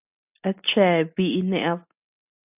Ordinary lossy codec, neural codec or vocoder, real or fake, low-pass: AAC, 32 kbps; none; real; 3.6 kHz